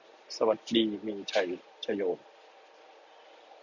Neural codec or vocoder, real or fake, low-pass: none; real; 7.2 kHz